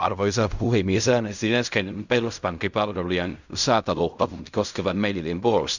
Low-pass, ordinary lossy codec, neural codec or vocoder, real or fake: 7.2 kHz; none; codec, 16 kHz in and 24 kHz out, 0.4 kbps, LongCat-Audio-Codec, fine tuned four codebook decoder; fake